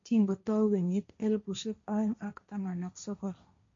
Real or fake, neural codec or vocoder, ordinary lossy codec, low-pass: fake; codec, 16 kHz, 1.1 kbps, Voila-Tokenizer; MP3, 48 kbps; 7.2 kHz